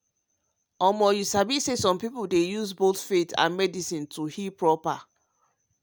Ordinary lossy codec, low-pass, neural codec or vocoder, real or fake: none; none; none; real